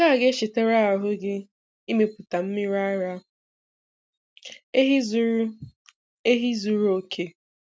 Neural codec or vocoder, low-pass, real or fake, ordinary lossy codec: none; none; real; none